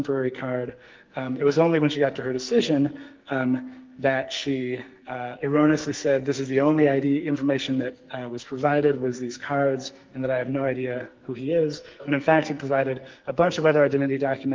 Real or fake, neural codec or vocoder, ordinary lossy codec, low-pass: fake; codec, 44.1 kHz, 2.6 kbps, SNAC; Opus, 32 kbps; 7.2 kHz